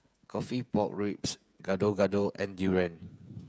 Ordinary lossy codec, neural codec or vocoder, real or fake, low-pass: none; codec, 16 kHz, 16 kbps, FreqCodec, smaller model; fake; none